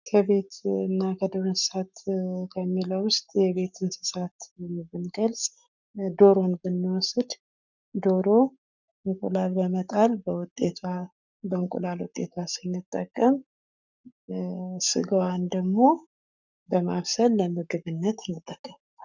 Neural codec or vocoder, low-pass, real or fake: codec, 44.1 kHz, 7.8 kbps, Pupu-Codec; 7.2 kHz; fake